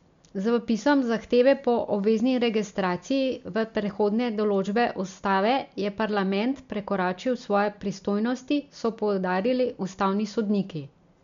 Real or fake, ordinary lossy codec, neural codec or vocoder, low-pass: real; MP3, 64 kbps; none; 7.2 kHz